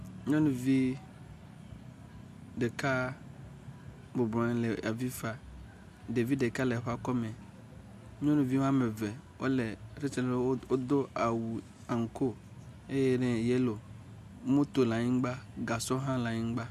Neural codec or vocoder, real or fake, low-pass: none; real; 14.4 kHz